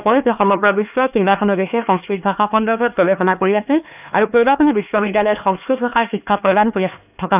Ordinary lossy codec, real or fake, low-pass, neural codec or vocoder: none; fake; 3.6 kHz; codec, 16 kHz, 2 kbps, X-Codec, HuBERT features, trained on LibriSpeech